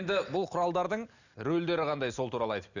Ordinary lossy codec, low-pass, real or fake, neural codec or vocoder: none; 7.2 kHz; real; none